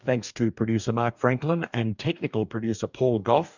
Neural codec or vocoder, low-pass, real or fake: codec, 44.1 kHz, 2.6 kbps, DAC; 7.2 kHz; fake